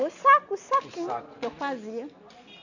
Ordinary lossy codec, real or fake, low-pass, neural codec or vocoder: none; real; 7.2 kHz; none